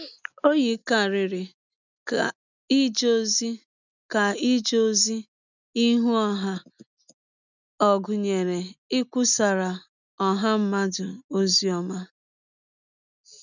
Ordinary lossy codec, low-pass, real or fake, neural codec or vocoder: none; 7.2 kHz; real; none